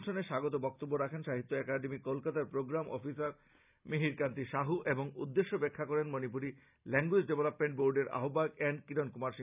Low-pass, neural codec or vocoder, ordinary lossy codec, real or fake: 3.6 kHz; none; none; real